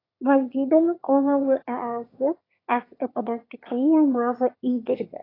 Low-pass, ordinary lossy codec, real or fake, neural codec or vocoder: 5.4 kHz; AAC, 24 kbps; fake; autoencoder, 22.05 kHz, a latent of 192 numbers a frame, VITS, trained on one speaker